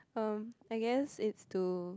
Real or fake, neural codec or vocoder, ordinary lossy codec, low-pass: real; none; none; none